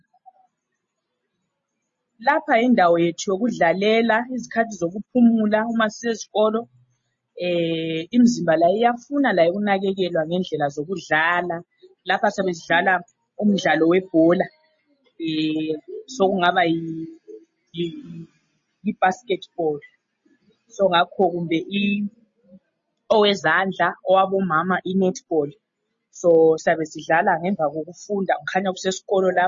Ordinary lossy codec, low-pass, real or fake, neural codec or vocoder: MP3, 32 kbps; 7.2 kHz; real; none